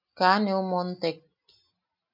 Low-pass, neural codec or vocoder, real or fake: 5.4 kHz; none; real